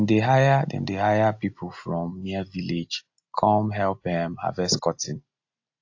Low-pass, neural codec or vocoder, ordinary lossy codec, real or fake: 7.2 kHz; none; AAC, 48 kbps; real